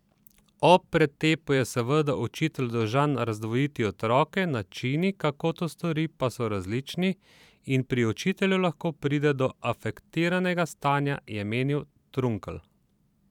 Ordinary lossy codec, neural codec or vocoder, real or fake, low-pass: none; vocoder, 44.1 kHz, 128 mel bands every 512 samples, BigVGAN v2; fake; 19.8 kHz